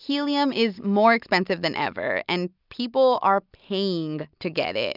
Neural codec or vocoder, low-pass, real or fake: none; 5.4 kHz; real